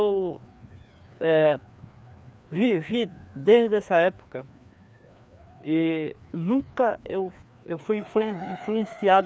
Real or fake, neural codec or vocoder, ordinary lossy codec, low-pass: fake; codec, 16 kHz, 2 kbps, FreqCodec, larger model; none; none